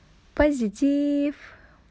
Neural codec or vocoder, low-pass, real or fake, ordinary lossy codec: none; none; real; none